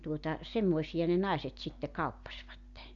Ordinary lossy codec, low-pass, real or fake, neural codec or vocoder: Opus, 64 kbps; 7.2 kHz; real; none